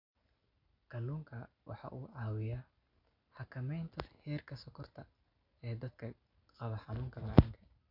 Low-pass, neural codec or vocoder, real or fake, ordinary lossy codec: 5.4 kHz; none; real; MP3, 48 kbps